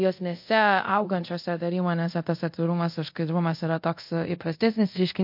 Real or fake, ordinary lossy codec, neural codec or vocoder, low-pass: fake; MP3, 32 kbps; codec, 24 kHz, 0.5 kbps, DualCodec; 5.4 kHz